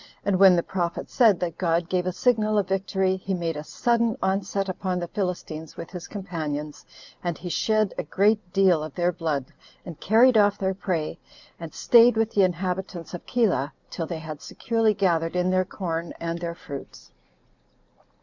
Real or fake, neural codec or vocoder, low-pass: real; none; 7.2 kHz